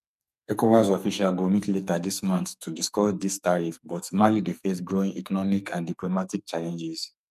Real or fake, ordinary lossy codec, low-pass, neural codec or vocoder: fake; none; 14.4 kHz; codec, 44.1 kHz, 2.6 kbps, SNAC